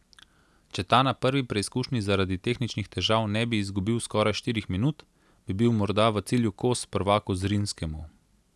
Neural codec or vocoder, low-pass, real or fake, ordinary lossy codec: none; none; real; none